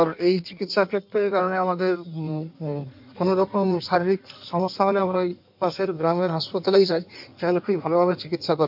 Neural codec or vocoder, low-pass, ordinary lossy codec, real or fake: codec, 16 kHz in and 24 kHz out, 1.1 kbps, FireRedTTS-2 codec; 5.4 kHz; MP3, 48 kbps; fake